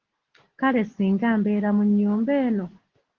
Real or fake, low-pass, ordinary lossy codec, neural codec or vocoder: real; 7.2 kHz; Opus, 16 kbps; none